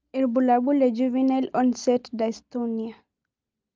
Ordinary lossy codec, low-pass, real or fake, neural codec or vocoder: Opus, 24 kbps; 7.2 kHz; real; none